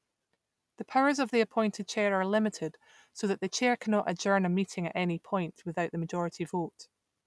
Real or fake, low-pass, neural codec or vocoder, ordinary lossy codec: real; none; none; none